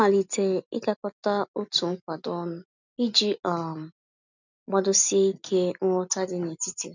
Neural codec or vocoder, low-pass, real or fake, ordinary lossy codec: none; 7.2 kHz; real; none